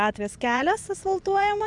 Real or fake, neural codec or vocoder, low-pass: fake; vocoder, 24 kHz, 100 mel bands, Vocos; 10.8 kHz